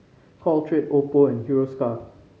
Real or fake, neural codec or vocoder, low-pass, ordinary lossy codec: real; none; none; none